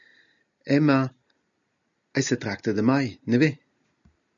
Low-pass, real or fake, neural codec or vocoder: 7.2 kHz; real; none